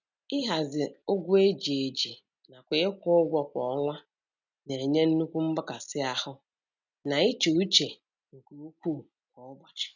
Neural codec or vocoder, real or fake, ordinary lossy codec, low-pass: none; real; none; 7.2 kHz